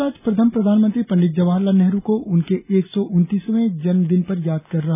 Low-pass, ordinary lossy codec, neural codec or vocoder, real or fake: 3.6 kHz; none; none; real